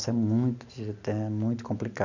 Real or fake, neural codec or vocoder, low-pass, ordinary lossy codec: real; none; 7.2 kHz; none